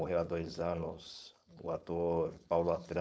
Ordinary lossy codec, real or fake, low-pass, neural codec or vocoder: none; fake; none; codec, 16 kHz, 4.8 kbps, FACodec